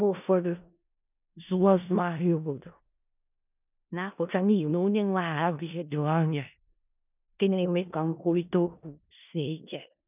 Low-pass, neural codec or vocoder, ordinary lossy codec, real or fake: 3.6 kHz; codec, 16 kHz in and 24 kHz out, 0.4 kbps, LongCat-Audio-Codec, four codebook decoder; none; fake